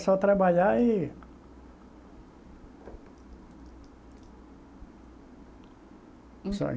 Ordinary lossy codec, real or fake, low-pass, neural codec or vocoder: none; real; none; none